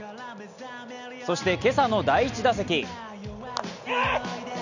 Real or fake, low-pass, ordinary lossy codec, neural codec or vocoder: real; 7.2 kHz; none; none